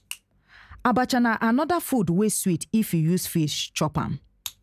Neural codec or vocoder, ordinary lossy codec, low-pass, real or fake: none; none; 14.4 kHz; real